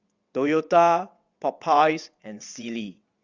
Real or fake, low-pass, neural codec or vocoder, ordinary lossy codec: fake; 7.2 kHz; vocoder, 22.05 kHz, 80 mel bands, WaveNeXt; Opus, 64 kbps